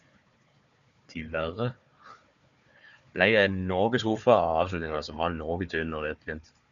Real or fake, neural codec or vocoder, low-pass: fake; codec, 16 kHz, 4 kbps, FunCodec, trained on Chinese and English, 50 frames a second; 7.2 kHz